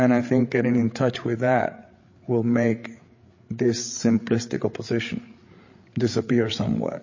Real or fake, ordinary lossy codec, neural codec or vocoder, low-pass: fake; MP3, 32 kbps; codec, 16 kHz, 8 kbps, FreqCodec, larger model; 7.2 kHz